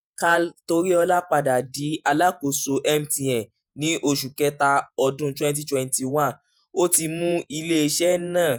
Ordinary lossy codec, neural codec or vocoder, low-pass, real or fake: none; vocoder, 48 kHz, 128 mel bands, Vocos; none; fake